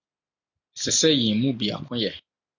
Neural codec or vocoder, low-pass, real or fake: none; 7.2 kHz; real